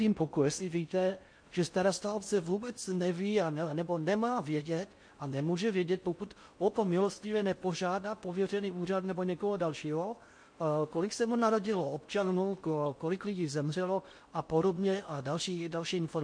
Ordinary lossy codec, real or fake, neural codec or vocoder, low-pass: MP3, 48 kbps; fake; codec, 16 kHz in and 24 kHz out, 0.6 kbps, FocalCodec, streaming, 4096 codes; 9.9 kHz